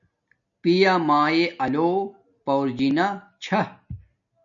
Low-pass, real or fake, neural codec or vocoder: 7.2 kHz; real; none